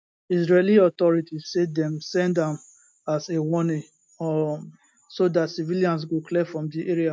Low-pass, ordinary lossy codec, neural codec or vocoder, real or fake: none; none; none; real